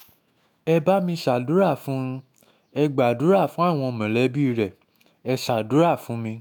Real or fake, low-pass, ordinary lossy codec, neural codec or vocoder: fake; none; none; autoencoder, 48 kHz, 128 numbers a frame, DAC-VAE, trained on Japanese speech